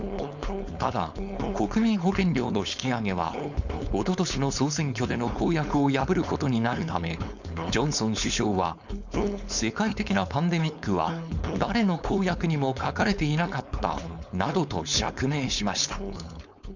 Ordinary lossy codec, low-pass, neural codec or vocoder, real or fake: none; 7.2 kHz; codec, 16 kHz, 4.8 kbps, FACodec; fake